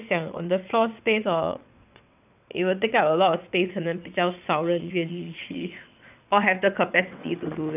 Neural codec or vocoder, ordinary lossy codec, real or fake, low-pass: vocoder, 22.05 kHz, 80 mel bands, WaveNeXt; none; fake; 3.6 kHz